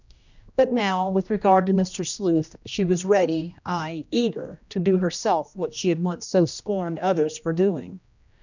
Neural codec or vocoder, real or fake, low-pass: codec, 16 kHz, 1 kbps, X-Codec, HuBERT features, trained on general audio; fake; 7.2 kHz